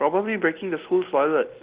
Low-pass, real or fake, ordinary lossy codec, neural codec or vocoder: 3.6 kHz; real; Opus, 16 kbps; none